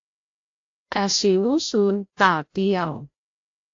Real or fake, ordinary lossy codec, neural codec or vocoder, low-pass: fake; AAC, 48 kbps; codec, 16 kHz, 0.5 kbps, FreqCodec, larger model; 7.2 kHz